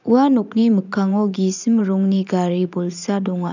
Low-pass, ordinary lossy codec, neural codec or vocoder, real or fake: 7.2 kHz; none; vocoder, 44.1 kHz, 80 mel bands, Vocos; fake